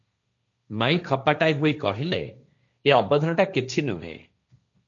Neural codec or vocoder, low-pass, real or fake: codec, 16 kHz, 1.1 kbps, Voila-Tokenizer; 7.2 kHz; fake